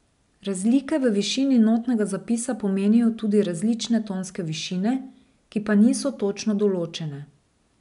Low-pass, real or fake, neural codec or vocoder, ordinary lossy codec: 10.8 kHz; real; none; none